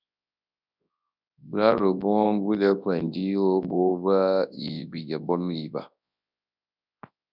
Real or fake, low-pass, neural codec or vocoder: fake; 5.4 kHz; codec, 24 kHz, 0.9 kbps, WavTokenizer, large speech release